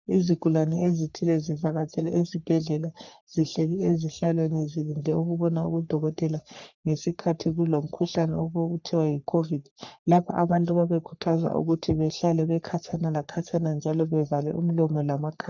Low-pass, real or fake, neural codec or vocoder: 7.2 kHz; fake; codec, 44.1 kHz, 3.4 kbps, Pupu-Codec